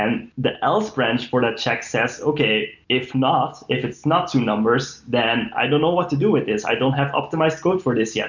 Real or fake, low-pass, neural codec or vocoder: real; 7.2 kHz; none